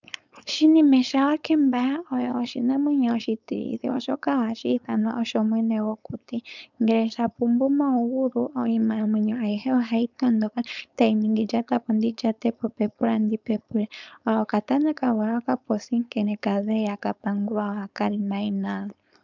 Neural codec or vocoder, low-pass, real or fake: codec, 16 kHz, 4.8 kbps, FACodec; 7.2 kHz; fake